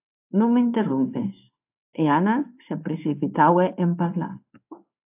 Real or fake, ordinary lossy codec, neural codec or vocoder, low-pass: fake; AAC, 32 kbps; codec, 16 kHz in and 24 kHz out, 1 kbps, XY-Tokenizer; 3.6 kHz